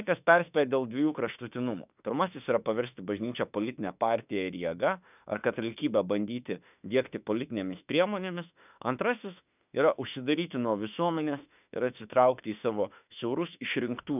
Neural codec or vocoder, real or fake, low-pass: autoencoder, 48 kHz, 32 numbers a frame, DAC-VAE, trained on Japanese speech; fake; 3.6 kHz